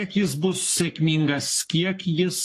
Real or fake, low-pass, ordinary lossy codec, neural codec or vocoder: fake; 14.4 kHz; AAC, 48 kbps; codec, 44.1 kHz, 3.4 kbps, Pupu-Codec